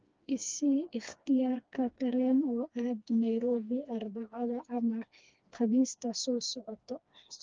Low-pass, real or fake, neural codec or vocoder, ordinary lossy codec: 7.2 kHz; fake; codec, 16 kHz, 2 kbps, FreqCodec, smaller model; Opus, 24 kbps